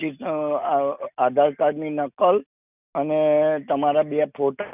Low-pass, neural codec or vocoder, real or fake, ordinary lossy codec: 3.6 kHz; none; real; none